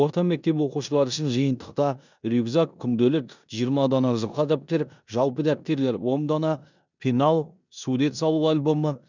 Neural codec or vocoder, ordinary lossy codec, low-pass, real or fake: codec, 16 kHz in and 24 kHz out, 0.9 kbps, LongCat-Audio-Codec, four codebook decoder; none; 7.2 kHz; fake